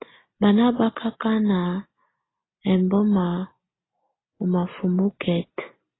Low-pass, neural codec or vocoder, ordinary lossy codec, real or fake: 7.2 kHz; none; AAC, 16 kbps; real